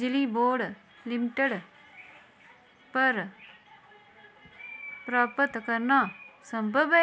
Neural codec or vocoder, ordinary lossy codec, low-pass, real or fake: none; none; none; real